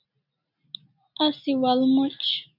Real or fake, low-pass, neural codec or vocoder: real; 5.4 kHz; none